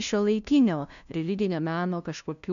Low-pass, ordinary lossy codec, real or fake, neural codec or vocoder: 7.2 kHz; MP3, 96 kbps; fake; codec, 16 kHz, 0.5 kbps, FunCodec, trained on LibriTTS, 25 frames a second